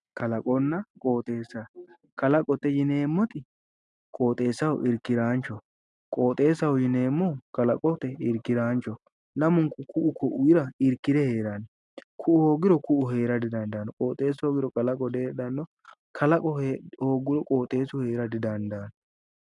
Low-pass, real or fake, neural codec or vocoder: 10.8 kHz; real; none